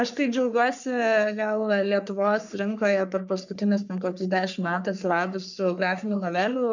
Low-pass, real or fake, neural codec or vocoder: 7.2 kHz; fake; codec, 44.1 kHz, 3.4 kbps, Pupu-Codec